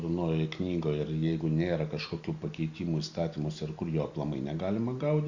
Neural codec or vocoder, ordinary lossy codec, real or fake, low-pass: none; Opus, 64 kbps; real; 7.2 kHz